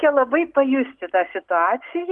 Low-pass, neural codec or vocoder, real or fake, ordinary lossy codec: 10.8 kHz; none; real; Opus, 24 kbps